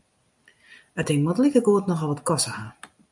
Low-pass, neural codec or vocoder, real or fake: 10.8 kHz; none; real